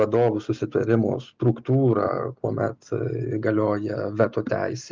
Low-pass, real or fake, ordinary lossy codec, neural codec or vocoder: 7.2 kHz; real; Opus, 24 kbps; none